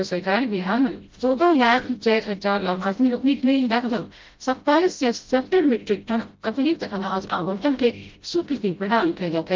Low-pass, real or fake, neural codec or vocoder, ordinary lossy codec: 7.2 kHz; fake; codec, 16 kHz, 0.5 kbps, FreqCodec, smaller model; Opus, 24 kbps